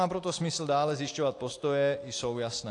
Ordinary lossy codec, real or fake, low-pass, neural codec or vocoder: AAC, 48 kbps; real; 10.8 kHz; none